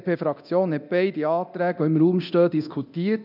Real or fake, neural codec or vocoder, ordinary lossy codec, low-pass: fake; codec, 24 kHz, 0.9 kbps, DualCodec; none; 5.4 kHz